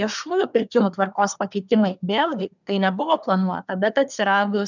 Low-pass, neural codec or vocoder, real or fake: 7.2 kHz; codec, 24 kHz, 1 kbps, SNAC; fake